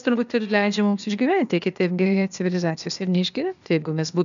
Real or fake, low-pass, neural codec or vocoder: fake; 7.2 kHz; codec, 16 kHz, 0.8 kbps, ZipCodec